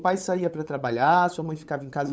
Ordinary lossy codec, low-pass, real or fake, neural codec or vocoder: none; none; fake; codec, 16 kHz, 8 kbps, FunCodec, trained on LibriTTS, 25 frames a second